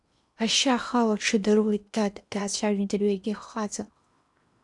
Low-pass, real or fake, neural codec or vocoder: 10.8 kHz; fake; codec, 16 kHz in and 24 kHz out, 0.8 kbps, FocalCodec, streaming, 65536 codes